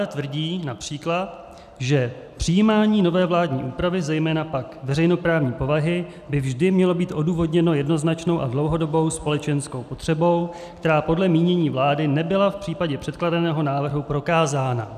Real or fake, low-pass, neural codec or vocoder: real; 14.4 kHz; none